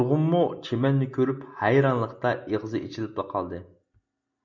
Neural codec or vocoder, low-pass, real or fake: none; 7.2 kHz; real